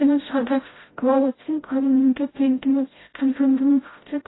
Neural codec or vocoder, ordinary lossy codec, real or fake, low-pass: codec, 16 kHz, 0.5 kbps, FreqCodec, smaller model; AAC, 16 kbps; fake; 7.2 kHz